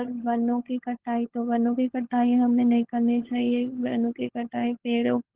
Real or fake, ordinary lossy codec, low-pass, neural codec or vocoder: fake; Opus, 16 kbps; 3.6 kHz; codec, 16 kHz, 16 kbps, FunCodec, trained on LibriTTS, 50 frames a second